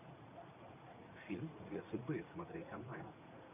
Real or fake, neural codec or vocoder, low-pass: fake; vocoder, 44.1 kHz, 80 mel bands, Vocos; 3.6 kHz